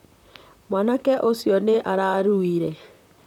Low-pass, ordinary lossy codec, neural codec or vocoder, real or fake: 19.8 kHz; none; vocoder, 44.1 kHz, 128 mel bands, Pupu-Vocoder; fake